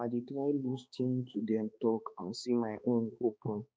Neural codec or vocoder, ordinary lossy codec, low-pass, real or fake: codec, 16 kHz, 2 kbps, X-Codec, HuBERT features, trained on balanced general audio; none; none; fake